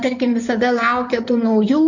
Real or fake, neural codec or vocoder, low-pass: fake; codec, 16 kHz in and 24 kHz out, 2.2 kbps, FireRedTTS-2 codec; 7.2 kHz